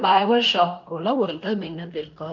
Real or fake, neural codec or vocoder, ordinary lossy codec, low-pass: fake; codec, 16 kHz in and 24 kHz out, 0.9 kbps, LongCat-Audio-Codec, fine tuned four codebook decoder; none; 7.2 kHz